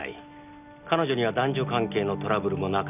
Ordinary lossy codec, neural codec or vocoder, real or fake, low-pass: none; none; real; 3.6 kHz